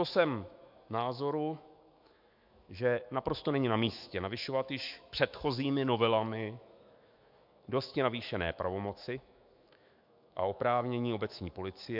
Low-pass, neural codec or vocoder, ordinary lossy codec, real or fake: 5.4 kHz; autoencoder, 48 kHz, 128 numbers a frame, DAC-VAE, trained on Japanese speech; MP3, 48 kbps; fake